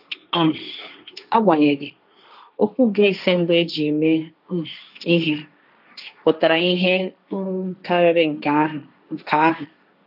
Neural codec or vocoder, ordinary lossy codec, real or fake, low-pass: codec, 16 kHz, 1.1 kbps, Voila-Tokenizer; none; fake; 5.4 kHz